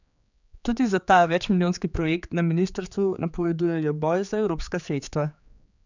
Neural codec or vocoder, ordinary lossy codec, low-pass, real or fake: codec, 16 kHz, 4 kbps, X-Codec, HuBERT features, trained on general audio; none; 7.2 kHz; fake